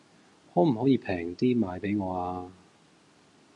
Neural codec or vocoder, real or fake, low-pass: none; real; 10.8 kHz